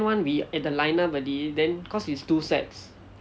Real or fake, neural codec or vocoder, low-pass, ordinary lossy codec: real; none; none; none